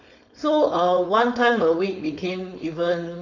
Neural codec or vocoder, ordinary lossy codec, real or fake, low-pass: codec, 16 kHz, 4.8 kbps, FACodec; none; fake; 7.2 kHz